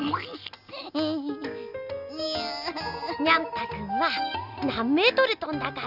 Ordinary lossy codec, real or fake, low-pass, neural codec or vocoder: none; real; 5.4 kHz; none